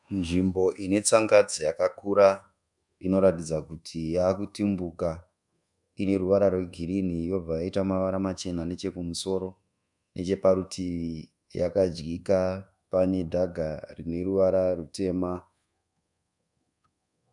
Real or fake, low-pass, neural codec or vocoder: fake; 10.8 kHz; codec, 24 kHz, 1.2 kbps, DualCodec